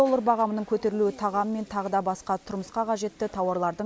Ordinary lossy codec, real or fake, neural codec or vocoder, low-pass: none; real; none; none